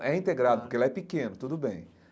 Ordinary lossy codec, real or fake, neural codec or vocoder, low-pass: none; real; none; none